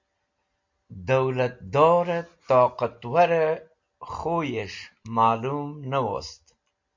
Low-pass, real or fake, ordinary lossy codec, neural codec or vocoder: 7.2 kHz; real; MP3, 64 kbps; none